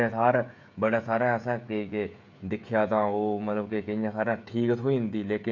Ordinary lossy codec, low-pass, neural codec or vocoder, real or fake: none; 7.2 kHz; codec, 16 kHz, 16 kbps, FreqCodec, smaller model; fake